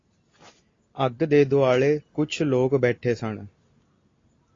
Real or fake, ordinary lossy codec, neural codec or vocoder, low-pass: real; AAC, 32 kbps; none; 7.2 kHz